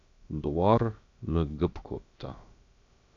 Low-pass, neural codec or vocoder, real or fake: 7.2 kHz; codec, 16 kHz, about 1 kbps, DyCAST, with the encoder's durations; fake